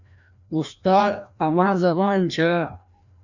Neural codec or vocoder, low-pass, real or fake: codec, 16 kHz, 1 kbps, FreqCodec, larger model; 7.2 kHz; fake